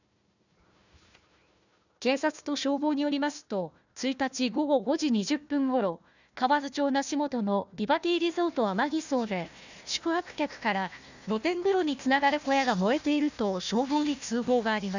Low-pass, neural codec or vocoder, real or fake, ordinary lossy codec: 7.2 kHz; codec, 16 kHz, 1 kbps, FunCodec, trained on Chinese and English, 50 frames a second; fake; none